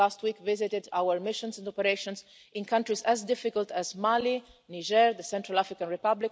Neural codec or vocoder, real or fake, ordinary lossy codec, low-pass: none; real; none; none